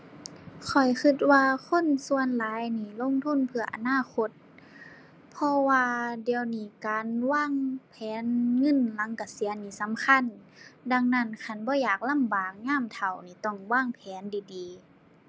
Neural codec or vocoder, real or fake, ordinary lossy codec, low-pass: none; real; none; none